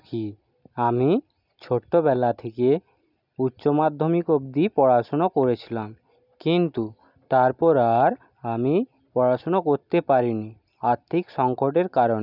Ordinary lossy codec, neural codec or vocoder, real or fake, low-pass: none; none; real; 5.4 kHz